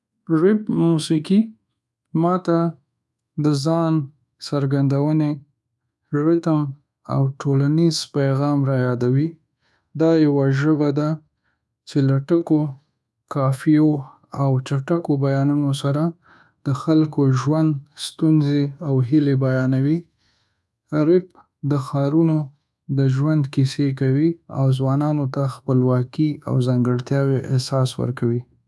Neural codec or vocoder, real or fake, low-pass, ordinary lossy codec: codec, 24 kHz, 1.2 kbps, DualCodec; fake; none; none